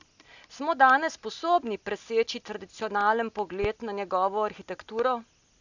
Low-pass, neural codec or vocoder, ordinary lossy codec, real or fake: 7.2 kHz; none; none; real